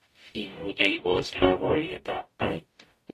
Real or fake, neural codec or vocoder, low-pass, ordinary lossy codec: fake; codec, 44.1 kHz, 0.9 kbps, DAC; 14.4 kHz; AAC, 48 kbps